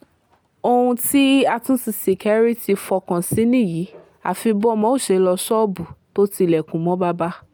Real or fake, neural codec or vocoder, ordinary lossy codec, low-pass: real; none; none; none